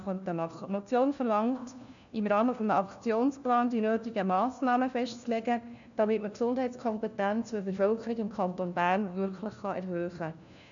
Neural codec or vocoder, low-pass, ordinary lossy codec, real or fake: codec, 16 kHz, 1 kbps, FunCodec, trained on LibriTTS, 50 frames a second; 7.2 kHz; none; fake